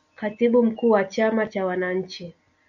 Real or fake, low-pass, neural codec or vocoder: real; 7.2 kHz; none